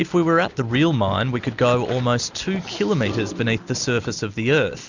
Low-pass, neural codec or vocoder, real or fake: 7.2 kHz; none; real